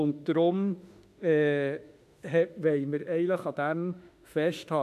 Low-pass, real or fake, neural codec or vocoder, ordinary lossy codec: 14.4 kHz; fake; autoencoder, 48 kHz, 32 numbers a frame, DAC-VAE, trained on Japanese speech; none